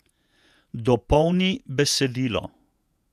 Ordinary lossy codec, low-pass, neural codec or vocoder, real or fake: none; 14.4 kHz; vocoder, 48 kHz, 128 mel bands, Vocos; fake